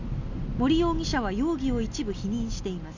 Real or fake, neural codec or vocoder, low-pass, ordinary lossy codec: real; none; 7.2 kHz; none